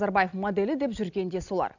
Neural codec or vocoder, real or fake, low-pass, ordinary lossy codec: none; real; 7.2 kHz; none